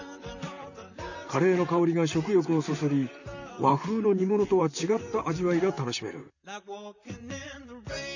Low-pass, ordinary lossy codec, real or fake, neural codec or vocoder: 7.2 kHz; none; fake; vocoder, 44.1 kHz, 128 mel bands, Pupu-Vocoder